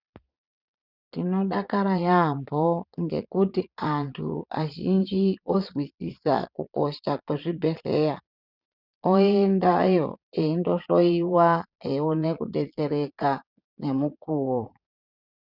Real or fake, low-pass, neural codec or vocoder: fake; 5.4 kHz; vocoder, 22.05 kHz, 80 mel bands, Vocos